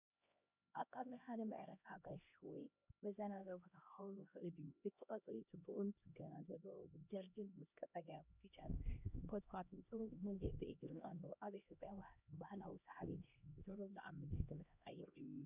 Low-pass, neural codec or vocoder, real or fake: 3.6 kHz; codec, 16 kHz, 1 kbps, X-Codec, HuBERT features, trained on LibriSpeech; fake